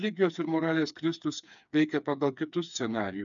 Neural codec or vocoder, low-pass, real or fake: codec, 16 kHz, 4 kbps, FreqCodec, smaller model; 7.2 kHz; fake